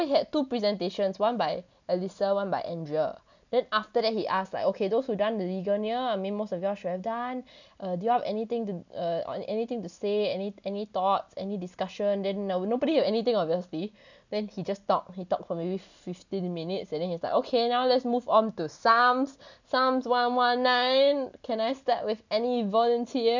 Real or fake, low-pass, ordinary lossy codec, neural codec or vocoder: real; 7.2 kHz; none; none